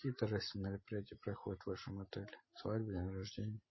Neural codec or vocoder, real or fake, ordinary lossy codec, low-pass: none; real; MP3, 24 kbps; 7.2 kHz